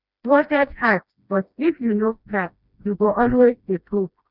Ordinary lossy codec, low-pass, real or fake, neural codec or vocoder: none; 5.4 kHz; fake; codec, 16 kHz, 1 kbps, FreqCodec, smaller model